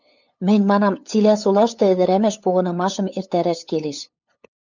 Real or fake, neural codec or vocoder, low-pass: fake; codec, 16 kHz, 8 kbps, FunCodec, trained on LibriTTS, 25 frames a second; 7.2 kHz